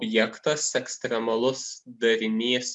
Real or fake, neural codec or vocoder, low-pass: real; none; 10.8 kHz